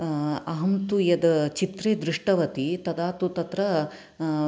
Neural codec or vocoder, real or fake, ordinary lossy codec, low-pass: none; real; none; none